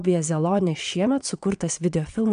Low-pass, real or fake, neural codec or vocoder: 9.9 kHz; fake; vocoder, 22.05 kHz, 80 mel bands, WaveNeXt